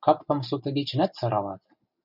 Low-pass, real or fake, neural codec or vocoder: 5.4 kHz; real; none